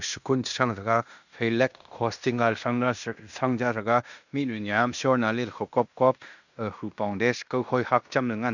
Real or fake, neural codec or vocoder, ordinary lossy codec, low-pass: fake; codec, 16 kHz in and 24 kHz out, 0.9 kbps, LongCat-Audio-Codec, fine tuned four codebook decoder; none; 7.2 kHz